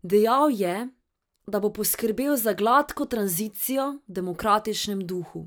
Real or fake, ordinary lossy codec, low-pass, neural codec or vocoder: fake; none; none; vocoder, 44.1 kHz, 128 mel bands every 512 samples, BigVGAN v2